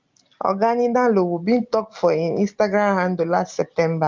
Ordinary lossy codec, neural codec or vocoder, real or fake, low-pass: Opus, 32 kbps; none; real; 7.2 kHz